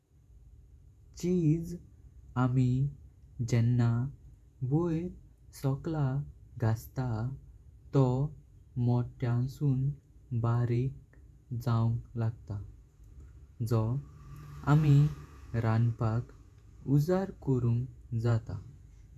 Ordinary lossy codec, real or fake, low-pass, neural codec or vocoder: none; fake; 14.4 kHz; vocoder, 48 kHz, 128 mel bands, Vocos